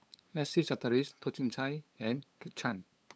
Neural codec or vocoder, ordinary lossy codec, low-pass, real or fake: codec, 16 kHz, 8 kbps, FunCodec, trained on LibriTTS, 25 frames a second; none; none; fake